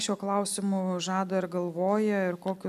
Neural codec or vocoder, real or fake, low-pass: none; real; 14.4 kHz